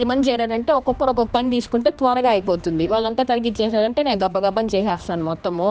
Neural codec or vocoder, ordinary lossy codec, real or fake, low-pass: codec, 16 kHz, 2 kbps, X-Codec, HuBERT features, trained on general audio; none; fake; none